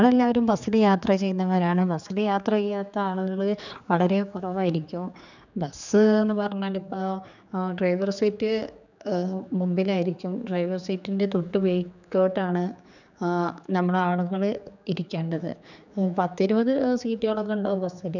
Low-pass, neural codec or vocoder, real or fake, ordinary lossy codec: 7.2 kHz; codec, 16 kHz, 4 kbps, X-Codec, HuBERT features, trained on general audio; fake; none